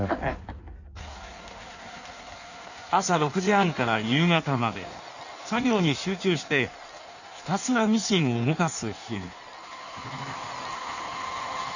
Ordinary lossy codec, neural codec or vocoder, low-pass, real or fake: none; codec, 16 kHz in and 24 kHz out, 1.1 kbps, FireRedTTS-2 codec; 7.2 kHz; fake